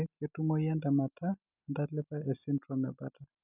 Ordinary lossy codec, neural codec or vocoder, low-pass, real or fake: none; none; 3.6 kHz; real